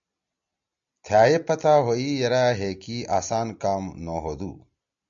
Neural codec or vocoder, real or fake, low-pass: none; real; 7.2 kHz